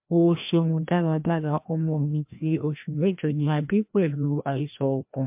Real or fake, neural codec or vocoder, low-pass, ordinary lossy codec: fake; codec, 16 kHz, 1 kbps, FreqCodec, larger model; 3.6 kHz; MP3, 32 kbps